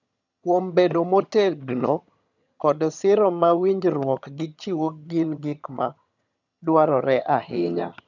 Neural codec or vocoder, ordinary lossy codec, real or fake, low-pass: vocoder, 22.05 kHz, 80 mel bands, HiFi-GAN; none; fake; 7.2 kHz